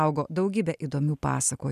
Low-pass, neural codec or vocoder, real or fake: 14.4 kHz; none; real